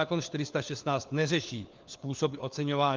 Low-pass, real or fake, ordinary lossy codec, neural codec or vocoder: 7.2 kHz; fake; Opus, 32 kbps; codec, 16 kHz, 4 kbps, FunCodec, trained on LibriTTS, 50 frames a second